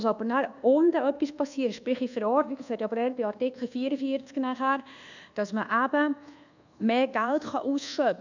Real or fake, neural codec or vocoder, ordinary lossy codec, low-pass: fake; codec, 24 kHz, 1.2 kbps, DualCodec; none; 7.2 kHz